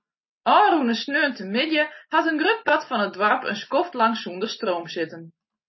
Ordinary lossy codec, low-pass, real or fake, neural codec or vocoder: MP3, 24 kbps; 7.2 kHz; real; none